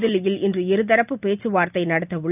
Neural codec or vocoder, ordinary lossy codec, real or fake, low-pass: none; none; real; 3.6 kHz